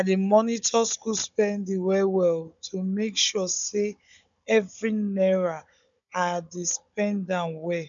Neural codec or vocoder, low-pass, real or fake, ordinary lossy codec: codec, 16 kHz, 16 kbps, FunCodec, trained on Chinese and English, 50 frames a second; 7.2 kHz; fake; none